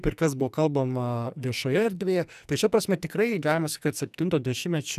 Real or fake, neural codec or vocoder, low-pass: fake; codec, 44.1 kHz, 2.6 kbps, SNAC; 14.4 kHz